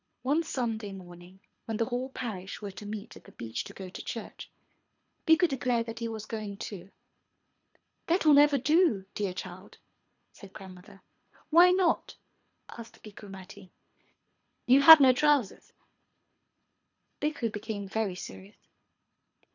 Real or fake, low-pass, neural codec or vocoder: fake; 7.2 kHz; codec, 24 kHz, 3 kbps, HILCodec